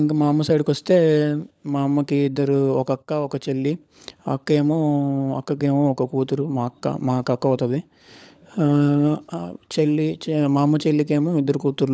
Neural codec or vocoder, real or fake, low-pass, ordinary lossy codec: codec, 16 kHz, 16 kbps, FunCodec, trained on LibriTTS, 50 frames a second; fake; none; none